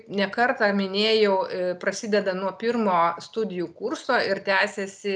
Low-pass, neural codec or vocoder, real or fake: 9.9 kHz; none; real